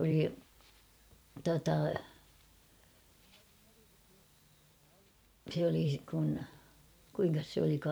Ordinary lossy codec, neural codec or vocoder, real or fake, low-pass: none; vocoder, 44.1 kHz, 128 mel bands every 512 samples, BigVGAN v2; fake; none